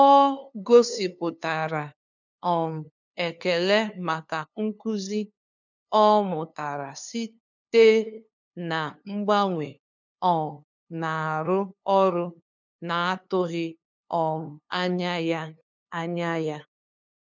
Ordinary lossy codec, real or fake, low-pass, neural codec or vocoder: none; fake; 7.2 kHz; codec, 16 kHz, 2 kbps, FunCodec, trained on LibriTTS, 25 frames a second